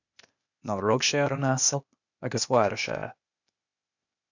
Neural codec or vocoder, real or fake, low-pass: codec, 16 kHz, 0.8 kbps, ZipCodec; fake; 7.2 kHz